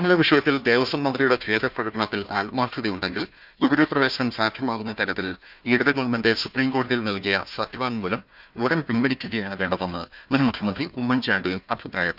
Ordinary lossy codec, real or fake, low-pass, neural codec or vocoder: none; fake; 5.4 kHz; codec, 16 kHz, 1 kbps, FunCodec, trained on Chinese and English, 50 frames a second